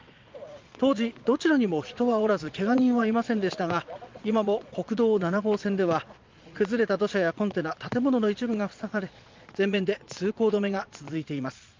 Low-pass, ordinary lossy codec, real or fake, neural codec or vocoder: 7.2 kHz; Opus, 24 kbps; fake; vocoder, 22.05 kHz, 80 mel bands, Vocos